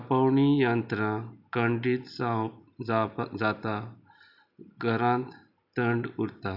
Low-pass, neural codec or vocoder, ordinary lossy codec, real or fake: 5.4 kHz; none; none; real